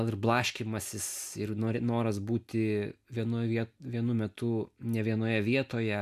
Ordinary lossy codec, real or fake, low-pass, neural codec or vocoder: MP3, 96 kbps; real; 14.4 kHz; none